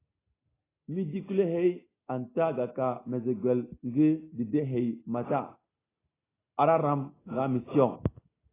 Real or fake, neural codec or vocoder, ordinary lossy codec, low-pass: real; none; AAC, 16 kbps; 3.6 kHz